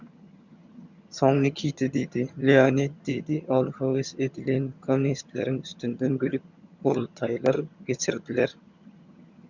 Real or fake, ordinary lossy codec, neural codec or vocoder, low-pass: fake; Opus, 64 kbps; vocoder, 22.05 kHz, 80 mel bands, HiFi-GAN; 7.2 kHz